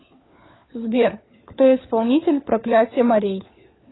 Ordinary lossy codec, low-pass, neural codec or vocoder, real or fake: AAC, 16 kbps; 7.2 kHz; codec, 16 kHz, 4 kbps, FunCodec, trained on LibriTTS, 50 frames a second; fake